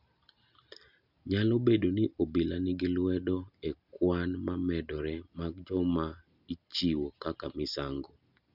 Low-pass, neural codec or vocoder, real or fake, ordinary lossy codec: 5.4 kHz; none; real; none